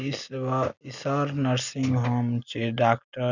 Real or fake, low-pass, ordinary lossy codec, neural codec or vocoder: real; 7.2 kHz; none; none